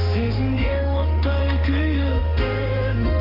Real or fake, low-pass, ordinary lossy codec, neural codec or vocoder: fake; 5.4 kHz; none; autoencoder, 48 kHz, 32 numbers a frame, DAC-VAE, trained on Japanese speech